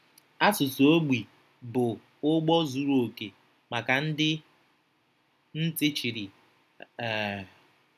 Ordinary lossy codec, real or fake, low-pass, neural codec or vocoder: none; real; 14.4 kHz; none